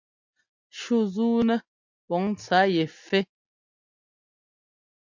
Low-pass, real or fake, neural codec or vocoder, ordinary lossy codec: 7.2 kHz; real; none; MP3, 64 kbps